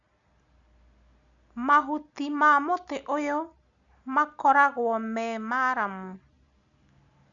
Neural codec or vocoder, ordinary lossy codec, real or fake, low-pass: none; MP3, 96 kbps; real; 7.2 kHz